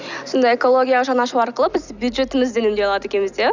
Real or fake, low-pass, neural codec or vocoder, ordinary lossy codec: real; 7.2 kHz; none; none